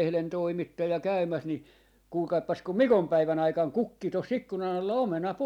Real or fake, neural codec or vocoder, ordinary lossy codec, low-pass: real; none; none; 19.8 kHz